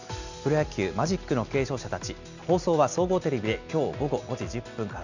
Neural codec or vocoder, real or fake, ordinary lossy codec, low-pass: none; real; none; 7.2 kHz